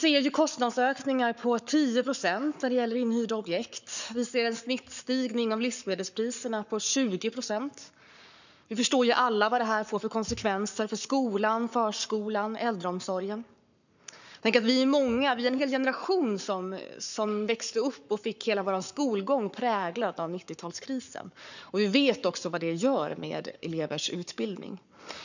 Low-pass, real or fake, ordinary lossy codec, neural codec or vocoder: 7.2 kHz; fake; none; codec, 44.1 kHz, 7.8 kbps, Pupu-Codec